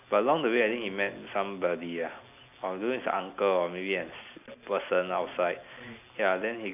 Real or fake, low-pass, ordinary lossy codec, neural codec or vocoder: real; 3.6 kHz; none; none